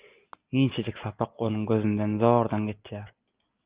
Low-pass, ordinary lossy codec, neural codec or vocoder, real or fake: 3.6 kHz; Opus, 24 kbps; none; real